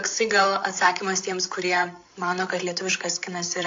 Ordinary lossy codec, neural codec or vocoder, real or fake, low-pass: AAC, 48 kbps; codec, 16 kHz, 8 kbps, FreqCodec, larger model; fake; 7.2 kHz